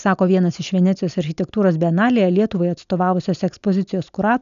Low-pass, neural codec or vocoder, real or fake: 7.2 kHz; none; real